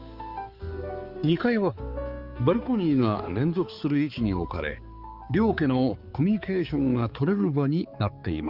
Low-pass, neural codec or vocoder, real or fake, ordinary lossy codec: 5.4 kHz; codec, 16 kHz, 4 kbps, X-Codec, HuBERT features, trained on balanced general audio; fake; Opus, 24 kbps